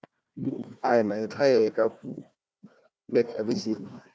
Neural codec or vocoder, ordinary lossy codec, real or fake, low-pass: codec, 16 kHz, 1 kbps, FunCodec, trained on Chinese and English, 50 frames a second; none; fake; none